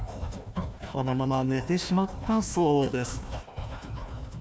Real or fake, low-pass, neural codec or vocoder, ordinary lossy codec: fake; none; codec, 16 kHz, 1 kbps, FunCodec, trained on Chinese and English, 50 frames a second; none